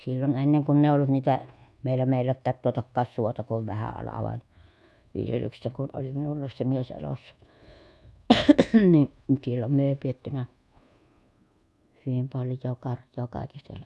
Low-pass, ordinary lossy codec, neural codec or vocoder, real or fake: none; none; codec, 24 kHz, 1.2 kbps, DualCodec; fake